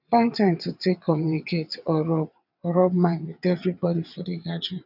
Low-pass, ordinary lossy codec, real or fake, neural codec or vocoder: 5.4 kHz; none; fake; vocoder, 22.05 kHz, 80 mel bands, WaveNeXt